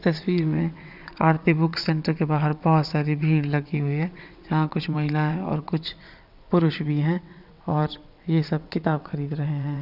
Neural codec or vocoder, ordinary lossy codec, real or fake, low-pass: vocoder, 22.05 kHz, 80 mel bands, WaveNeXt; none; fake; 5.4 kHz